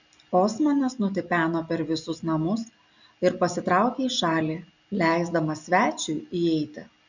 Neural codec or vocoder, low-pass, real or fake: none; 7.2 kHz; real